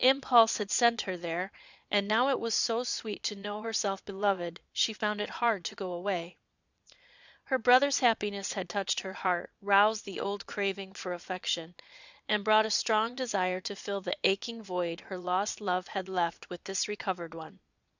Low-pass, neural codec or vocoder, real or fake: 7.2 kHz; vocoder, 22.05 kHz, 80 mel bands, Vocos; fake